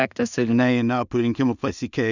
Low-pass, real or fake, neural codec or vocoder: 7.2 kHz; fake; codec, 16 kHz in and 24 kHz out, 0.4 kbps, LongCat-Audio-Codec, two codebook decoder